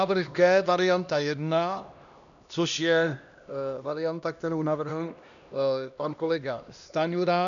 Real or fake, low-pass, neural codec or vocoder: fake; 7.2 kHz; codec, 16 kHz, 1 kbps, X-Codec, HuBERT features, trained on LibriSpeech